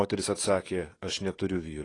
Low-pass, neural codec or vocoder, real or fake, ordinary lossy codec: 10.8 kHz; codec, 44.1 kHz, 7.8 kbps, DAC; fake; AAC, 32 kbps